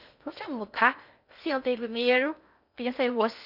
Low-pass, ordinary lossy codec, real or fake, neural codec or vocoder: 5.4 kHz; none; fake; codec, 16 kHz in and 24 kHz out, 0.8 kbps, FocalCodec, streaming, 65536 codes